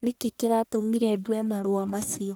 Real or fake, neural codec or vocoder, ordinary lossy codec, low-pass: fake; codec, 44.1 kHz, 1.7 kbps, Pupu-Codec; none; none